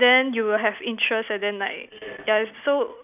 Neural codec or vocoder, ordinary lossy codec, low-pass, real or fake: none; none; 3.6 kHz; real